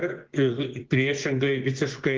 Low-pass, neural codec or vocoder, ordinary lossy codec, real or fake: 7.2 kHz; vocoder, 24 kHz, 100 mel bands, Vocos; Opus, 16 kbps; fake